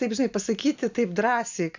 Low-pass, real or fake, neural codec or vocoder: 7.2 kHz; real; none